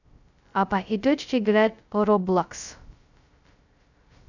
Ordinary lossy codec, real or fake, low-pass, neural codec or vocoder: none; fake; 7.2 kHz; codec, 16 kHz, 0.2 kbps, FocalCodec